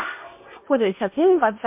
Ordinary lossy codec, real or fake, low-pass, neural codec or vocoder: MP3, 32 kbps; fake; 3.6 kHz; codec, 16 kHz in and 24 kHz out, 0.8 kbps, FocalCodec, streaming, 65536 codes